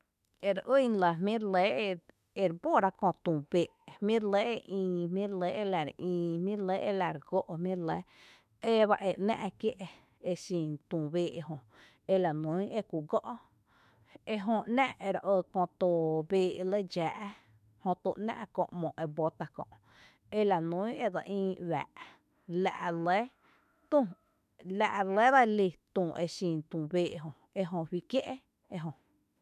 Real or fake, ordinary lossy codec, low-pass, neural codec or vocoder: fake; MP3, 96 kbps; 14.4 kHz; autoencoder, 48 kHz, 32 numbers a frame, DAC-VAE, trained on Japanese speech